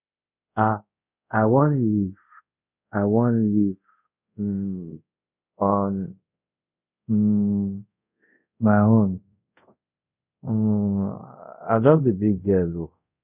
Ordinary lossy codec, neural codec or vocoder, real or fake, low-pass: none; codec, 24 kHz, 0.5 kbps, DualCodec; fake; 3.6 kHz